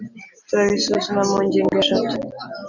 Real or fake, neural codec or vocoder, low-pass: real; none; 7.2 kHz